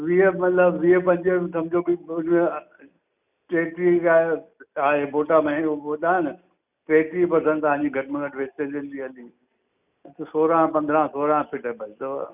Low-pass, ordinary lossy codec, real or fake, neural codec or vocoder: 3.6 kHz; none; real; none